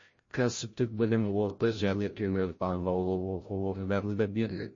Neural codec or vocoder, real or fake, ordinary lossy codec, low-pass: codec, 16 kHz, 0.5 kbps, FreqCodec, larger model; fake; MP3, 32 kbps; 7.2 kHz